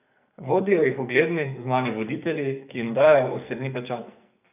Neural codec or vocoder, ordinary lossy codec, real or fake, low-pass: codec, 44.1 kHz, 2.6 kbps, SNAC; none; fake; 3.6 kHz